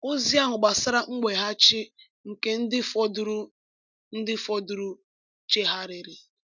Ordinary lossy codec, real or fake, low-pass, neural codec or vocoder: none; real; 7.2 kHz; none